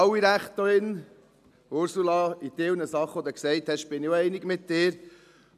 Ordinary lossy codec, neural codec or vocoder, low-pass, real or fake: none; none; 14.4 kHz; real